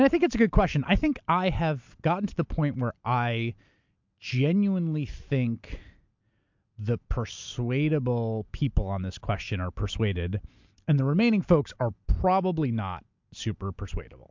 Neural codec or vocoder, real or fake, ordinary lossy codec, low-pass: none; real; MP3, 64 kbps; 7.2 kHz